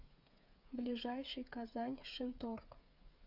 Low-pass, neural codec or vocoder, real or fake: 5.4 kHz; codec, 16 kHz, 8 kbps, FreqCodec, smaller model; fake